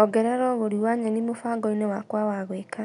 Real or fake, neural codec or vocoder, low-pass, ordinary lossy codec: real; none; 10.8 kHz; none